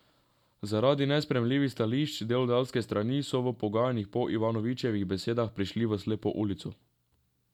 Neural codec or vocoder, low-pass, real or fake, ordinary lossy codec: none; 19.8 kHz; real; none